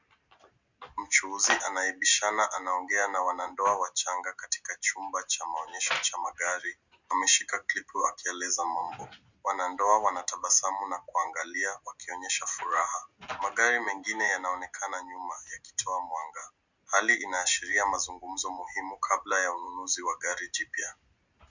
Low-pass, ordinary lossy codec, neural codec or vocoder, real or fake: 7.2 kHz; Opus, 64 kbps; none; real